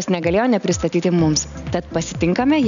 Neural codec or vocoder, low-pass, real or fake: none; 7.2 kHz; real